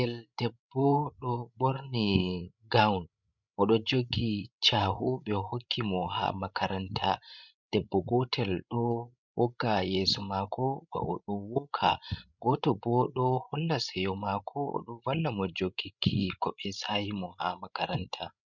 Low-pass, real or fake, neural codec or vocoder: 7.2 kHz; fake; codec, 16 kHz, 16 kbps, FreqCodec, larger model